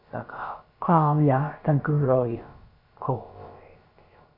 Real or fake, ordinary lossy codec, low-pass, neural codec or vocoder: fake; MP3, 32 kbps; 5.4 kHz; codec, 16 kHz, about 1 kbps, DyCAST, with the encoder's durations